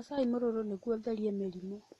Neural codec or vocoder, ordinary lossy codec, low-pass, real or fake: none; AAC, 32 kbps; 14.4 kHz; real